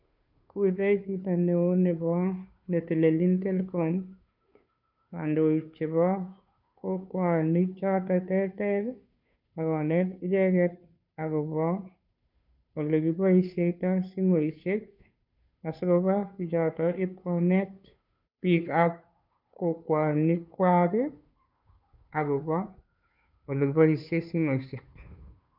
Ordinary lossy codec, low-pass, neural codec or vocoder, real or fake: none; 5.4 kHz; codec, 16 kHz, 2 kbps, FunCodec, trained on Chinese and English, 25 frames a second; fake